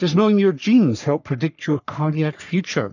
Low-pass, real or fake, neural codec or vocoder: 7.2 kHz; fake; codec, 44.1 kHz, 3.4 kbps, Pupu-Codec